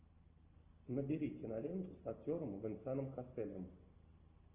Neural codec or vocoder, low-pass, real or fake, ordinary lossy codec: none; 3.6 kHz; real; Opus, 32 kbps